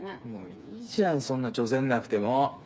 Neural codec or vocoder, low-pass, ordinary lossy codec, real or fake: codec, 16 kHz, 4 kbps, FreqCodec, smaller model; none; none; fake